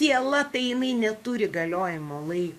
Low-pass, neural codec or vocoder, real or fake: 14.4 kHz; codec, 44.1 kHz, 7.8 kbps, DAC; fake